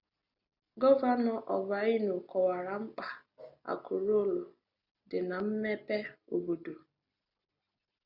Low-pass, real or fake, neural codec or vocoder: 5.4 kHz; real; none